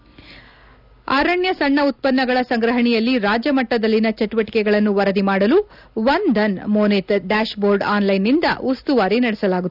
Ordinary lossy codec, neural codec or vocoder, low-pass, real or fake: none; none; 5.4 kHz; real